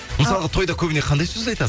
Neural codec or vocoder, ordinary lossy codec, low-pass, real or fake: none; none; none; real